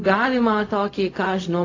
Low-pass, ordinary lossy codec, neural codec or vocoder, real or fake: 7.2 kHz; AAC, 32 kbps; codec, 16 kHz, 0.4 kbps, LongCat-Audio-Codec; fake